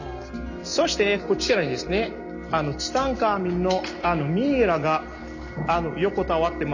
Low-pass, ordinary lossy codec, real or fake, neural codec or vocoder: 7.2 kHz; none; real; none